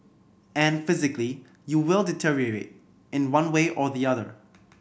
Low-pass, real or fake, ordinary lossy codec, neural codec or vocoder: none; real; none; none